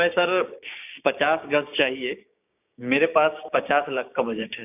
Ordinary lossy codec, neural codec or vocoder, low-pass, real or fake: none; codec, 16 kHz, 6 kbps, DAC; 3.6 kHz; fake